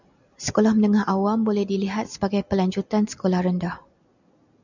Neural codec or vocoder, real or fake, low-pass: none; real; 7.2 kHz